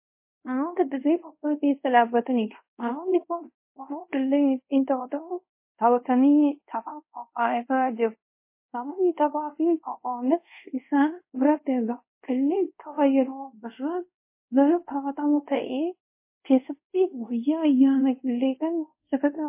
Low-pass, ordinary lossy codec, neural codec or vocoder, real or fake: 3.6 kHz; MP3, 24 kbps; codec, 24 kHz, 0.5 kbps, DualCodec; fake